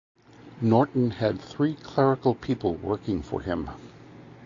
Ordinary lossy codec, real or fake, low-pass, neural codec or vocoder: AAC, 48 kbps; real; 7.2 kHz; none